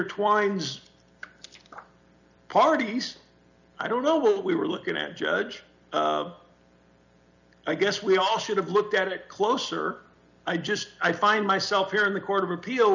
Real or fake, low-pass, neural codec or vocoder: real; 7.2 kHz; none